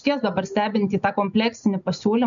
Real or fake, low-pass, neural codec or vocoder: real; 7.2 kHz; none